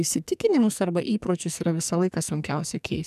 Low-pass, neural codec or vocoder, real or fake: 14.4 kHz; codec, 44.1 kHz, 2.6 kbps, SNAC; fake